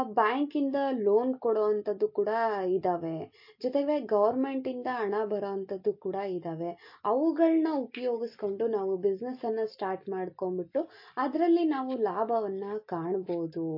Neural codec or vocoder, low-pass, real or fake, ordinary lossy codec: none; 5.4 kHz; real; MP3, 32 kbps